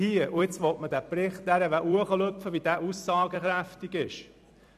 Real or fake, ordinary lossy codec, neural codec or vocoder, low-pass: fake; none; vocoder, 44.1 kHz, 128 mel bands every 256 samples, BigVGAN v2; 14.4 kHz